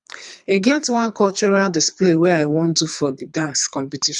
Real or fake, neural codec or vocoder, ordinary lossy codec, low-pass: fake; codec, 24 kHz, 3 kbps, HILCodec; none; 10.8 kHz